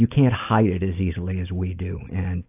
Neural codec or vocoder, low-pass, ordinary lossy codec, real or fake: none; 3.6 kHz; AAC, 32 kbps; real